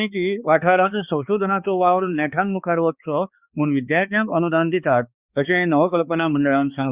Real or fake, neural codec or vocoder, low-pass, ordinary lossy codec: fake; codec, 16 kHz, 2 kbps, X-Codec, HuBERT features, trained on balanced general audio; 3.6 kHz; Opus, 64 kbps